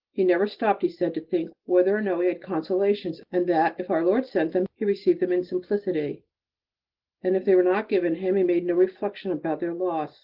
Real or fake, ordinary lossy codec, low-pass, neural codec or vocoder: real; Opus, 16 kbps; 5.4 kHz; none